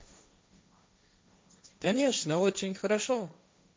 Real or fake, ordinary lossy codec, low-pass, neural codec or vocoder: fake; none; none; codec, 16 kHz, 1.1 kbps, Voila-Tokenizer